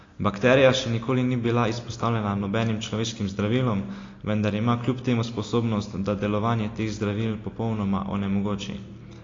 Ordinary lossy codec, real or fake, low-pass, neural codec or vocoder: AAC, 32 kbps; real; 7.2 kHz; none